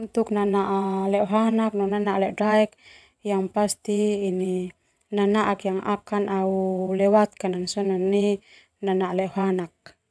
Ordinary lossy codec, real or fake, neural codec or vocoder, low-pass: none; fake; vocoder, 22.05 kHz, 80 mel bands, WaveNeXt; none